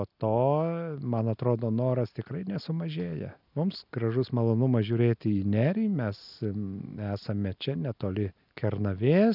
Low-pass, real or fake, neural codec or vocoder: 5.4 kHz; real; none